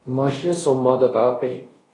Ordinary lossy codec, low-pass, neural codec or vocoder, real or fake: AAC, 32 kbps; 10.8 kHz; codec, 24 kHz, 0.5 kbps, DualCodec; fake